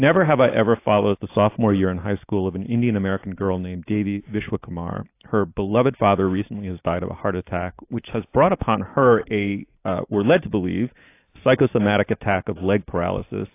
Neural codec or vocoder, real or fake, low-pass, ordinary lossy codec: none; real; 3.6 kHz; AAC, 24 kbps